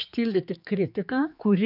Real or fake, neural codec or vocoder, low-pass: fake; codec, 16 kHz, 4 kbps, X-Codec, HuBERT features, trained on general audio; 5.4 kHz